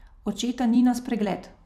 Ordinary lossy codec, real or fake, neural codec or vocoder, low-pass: none; fake; vocoder, 44.1 kHz, 128 mel bands every 256 samples, BigVGAN v2; 14.4 kHz